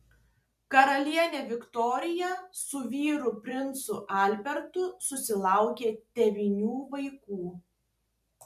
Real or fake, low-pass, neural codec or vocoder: real; 14.4 kHz; none